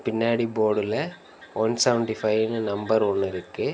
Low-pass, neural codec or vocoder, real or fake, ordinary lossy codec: none; none; real; none